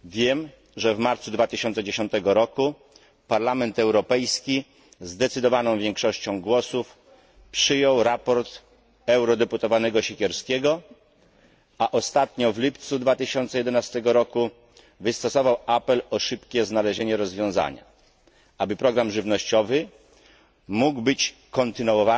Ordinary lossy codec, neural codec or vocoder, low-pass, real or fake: none; none; none; real